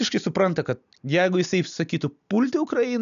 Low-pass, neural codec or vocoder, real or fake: 7.2 kHz; none; real